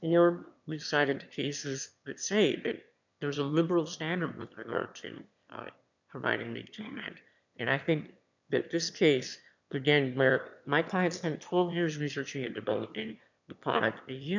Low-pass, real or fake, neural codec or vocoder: 7.2 kHz; fake; autoencoder, 22.05 kHz, a latent of 192 numbers a frame, VITS, trained on one speaker